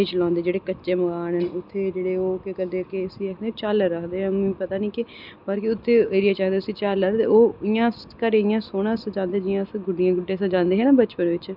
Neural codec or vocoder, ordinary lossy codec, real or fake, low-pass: none; none; real; 5.4 kHz